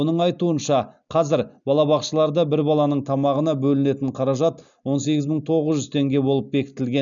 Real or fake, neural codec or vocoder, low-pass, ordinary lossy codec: real; none; 7.2 kHz; none